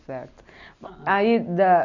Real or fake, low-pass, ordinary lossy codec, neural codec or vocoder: real; 7.2 kHz; none; none